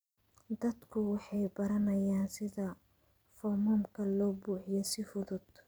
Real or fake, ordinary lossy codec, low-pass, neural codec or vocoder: fake; none; none; vocoder, 44.1 kHz, 128 mel bands every 256 samples, BigVGAN v2